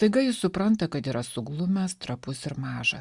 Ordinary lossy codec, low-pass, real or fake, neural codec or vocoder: Opus, 64 kbps; 10.8 kHz; real; none